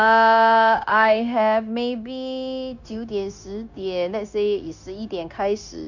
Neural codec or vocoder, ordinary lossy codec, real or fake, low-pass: codec, 16 kHz, 0.9 kbps, LongCat-Audio-Codec; none; fake; 7.2 kHz